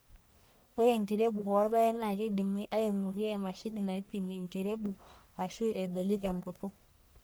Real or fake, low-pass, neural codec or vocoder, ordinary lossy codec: fake; none; codec, 44.1 kHz, 1.7 kbps, Pupu-Codec; none